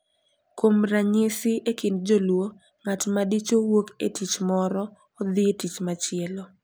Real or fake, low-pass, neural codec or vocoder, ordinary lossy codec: real; none; none; none